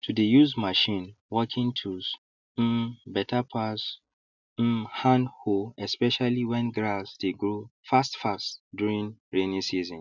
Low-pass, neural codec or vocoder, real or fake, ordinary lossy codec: 7.2 kHz; none; real; none